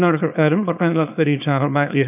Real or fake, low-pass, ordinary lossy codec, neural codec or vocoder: fake; 3.6 kHz; none; codec, 24 kHz, 0.9 kbps, WavTokenizer, small release